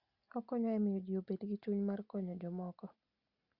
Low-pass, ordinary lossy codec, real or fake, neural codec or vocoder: 5.4 kHz; Opus, 64 kbps; real; none